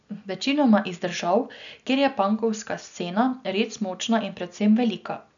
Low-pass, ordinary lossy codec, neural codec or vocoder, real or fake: 7.2 kHz; none; none; real